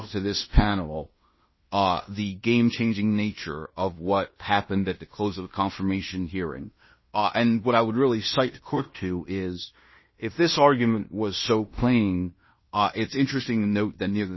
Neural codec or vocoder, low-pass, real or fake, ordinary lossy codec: codec, 16 kHz in and 24 kHz out, 0.9 kbps, LongCat-Audio-Codec, fine tuned four codebook decoder; 7.2 kHz; fake; MP3, 24 kbps